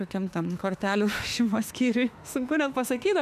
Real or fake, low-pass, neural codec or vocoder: fake; 14.4 kHz; autoencoder, 48 kHz, 32 numbers a frame, DAC-VAE, trained on Japanese speech